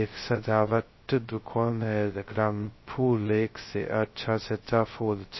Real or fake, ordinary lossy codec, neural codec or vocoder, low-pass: fake; MP3, 24 kbps; codec, 16 kHz, 0.2 kbps, FocalCodec; 7.2 kHz